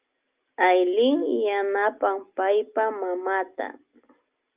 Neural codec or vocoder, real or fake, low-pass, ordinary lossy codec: none; real; 3.6 kHz; Opus, 24 kbps